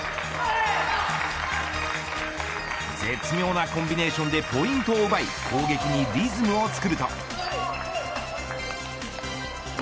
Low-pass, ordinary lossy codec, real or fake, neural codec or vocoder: none; none; real; none